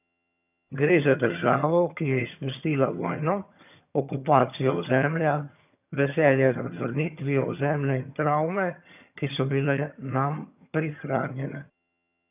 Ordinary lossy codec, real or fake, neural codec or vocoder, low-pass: none; fake; vocoder, 22.05 kHz, 80 mel bands, HiFi-GAN; 3.6 kHz